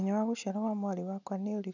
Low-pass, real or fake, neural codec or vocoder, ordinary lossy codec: 7.2 kHz; real; none; none